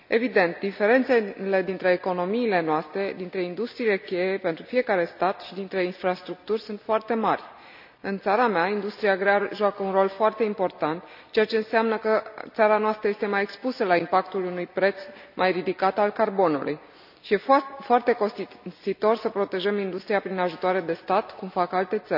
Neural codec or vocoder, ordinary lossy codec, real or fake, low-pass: none; none; real; 5.4 kHz